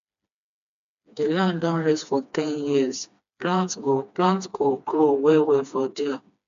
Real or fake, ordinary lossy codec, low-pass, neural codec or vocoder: fake; MP3, 64 kbps; 7.2 kHz; codec, 16 kHz, 2 kbps, FreqCodec, smaller model